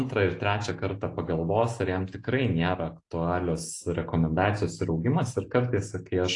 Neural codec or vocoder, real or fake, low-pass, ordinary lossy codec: none; real; 10.8 kHz; AAC, 48 kbps